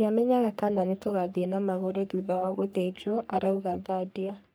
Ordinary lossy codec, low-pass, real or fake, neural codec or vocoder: none; none; fake; codec, 44.1 kHz, 3.4 kbps, Pupu-Codec